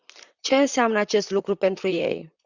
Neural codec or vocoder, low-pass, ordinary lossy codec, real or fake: vocoder, 44.1 kHz, 128 mel bands, Pupu-Vocoder; 7.2 kHz; Opus, 64 kbps; fake